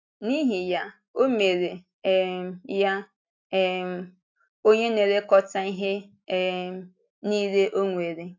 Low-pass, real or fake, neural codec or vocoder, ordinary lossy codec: 7.2 kHz; real; none; AAC, 48 kbps